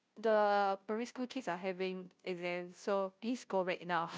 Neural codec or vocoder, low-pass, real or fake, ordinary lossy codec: codec, 16 kHz, 0.5 kbps, FunCodec, trained on Chinese and English, 25 frames a second; none; fake; none